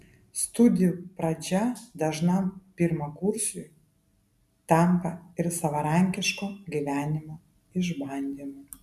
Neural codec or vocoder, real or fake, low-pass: none; real; 14.4 kHz